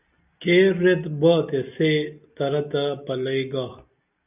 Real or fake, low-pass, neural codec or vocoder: real; 3.6 kHz; none